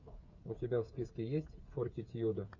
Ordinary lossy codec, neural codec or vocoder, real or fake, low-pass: Opus, 64 kbps; codec, 16 kHz, 8 kbps, FreqCodec, smaller model; fake; 7.2 kHz